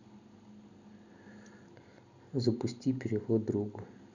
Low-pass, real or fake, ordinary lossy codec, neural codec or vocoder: 7.2 kHz; real; none; none